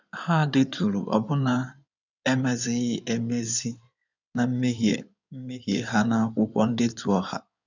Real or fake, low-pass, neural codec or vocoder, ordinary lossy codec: fake; 7.2 kHz; codec, 16 kHz in and 24 kHz out, 2.2 kbps, FireRedTTS-2 codec; none